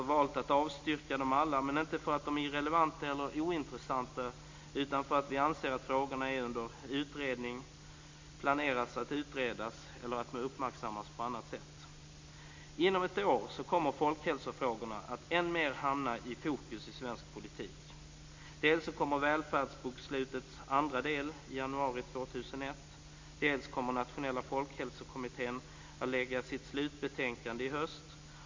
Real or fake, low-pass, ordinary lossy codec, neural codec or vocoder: real; 7.2 kHz; MP3, 48 kbps; none